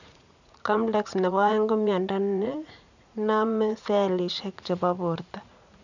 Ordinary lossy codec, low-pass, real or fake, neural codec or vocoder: none; 7.2 kHz; fake; vocoder, 44.1 kHz, 80 mel bands, Vocos